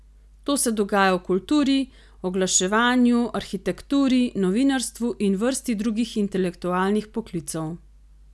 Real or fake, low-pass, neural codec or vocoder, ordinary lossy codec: real; none; none; none